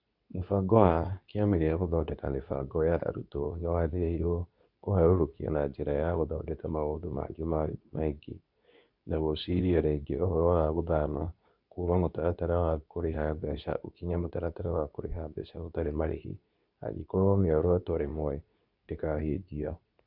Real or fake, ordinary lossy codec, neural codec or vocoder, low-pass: fake; none; codec, 24 kHz, 0.9 kbps, WavTokenizer, medium speech release version 2; 5.4 kHz